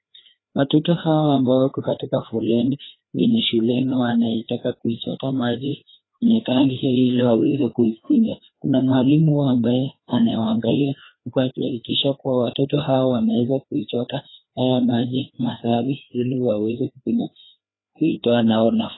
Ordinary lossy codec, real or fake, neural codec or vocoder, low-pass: AAC, 16 kbps; fake; codec, 16 kHz, 2 kbps, FreqCodec, larger model; 7.2 kHz